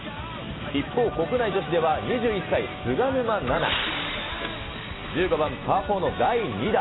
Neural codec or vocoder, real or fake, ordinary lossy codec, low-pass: none; real; AAC, 16 kbps; 7.2 kHz